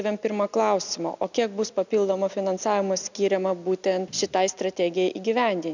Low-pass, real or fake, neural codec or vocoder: 7.2 kHz; real; none